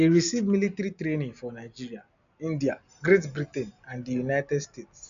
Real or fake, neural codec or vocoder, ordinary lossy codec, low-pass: real; none; none; 7.2 kHz